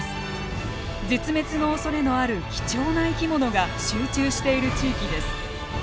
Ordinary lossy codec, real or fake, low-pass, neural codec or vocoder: none; real; none; none